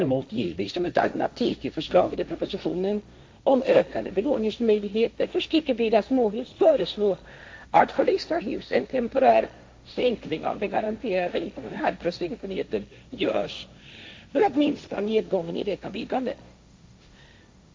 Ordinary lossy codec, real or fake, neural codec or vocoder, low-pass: none; fake; codec, 16 kHz, 1.1 kbps, Voila-Tokenizer; none